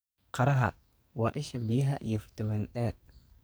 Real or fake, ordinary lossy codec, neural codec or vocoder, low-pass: fake; none; codec, 44.1 kHz, 2.6 kbps, SNAC; none